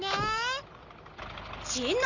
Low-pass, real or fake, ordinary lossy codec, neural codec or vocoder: 7.2 kHz; real; none; none